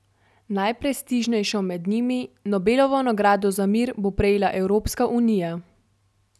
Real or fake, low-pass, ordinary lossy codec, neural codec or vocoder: real; none; none; none